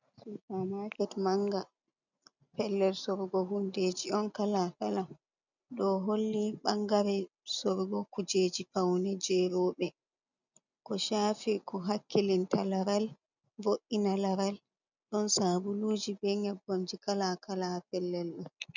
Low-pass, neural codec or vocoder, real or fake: 7.2 kHz; none; real